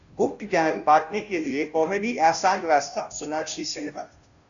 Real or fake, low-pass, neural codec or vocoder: fake; 7.2 kHz; codec, 16 kHz, 0.5 kbps, FunCodec, trained on Chinese and English, 25 frames a second